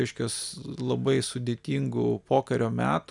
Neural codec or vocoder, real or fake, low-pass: none; real; 10.8 kHz